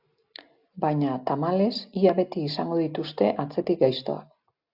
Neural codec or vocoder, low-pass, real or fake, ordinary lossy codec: none; 5.4 kHz; real; AAC, 48 kbps